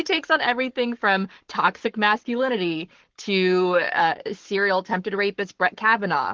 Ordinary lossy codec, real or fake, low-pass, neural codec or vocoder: Opus, 16 kbps; fake; 7.2 kHz; codec, 44.1 kHz, 7.8 kbps, Pupu-Codec